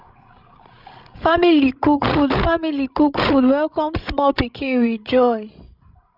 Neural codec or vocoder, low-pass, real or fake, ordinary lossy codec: codec, 16 kHz, 16 kbps, FreqCodec, larger model; 5.4 kHz; fake; none